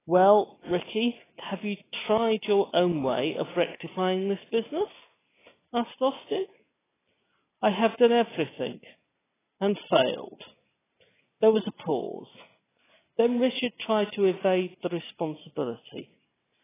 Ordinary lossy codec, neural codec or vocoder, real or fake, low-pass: AAC, 16 kbps; none; real; 3.6 kHz